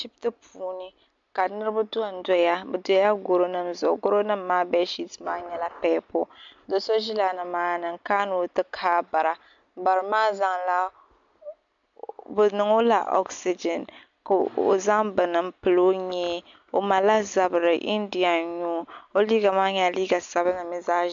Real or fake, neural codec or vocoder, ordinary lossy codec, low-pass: real; none; MP3, 64 kbps; 7.2 kHz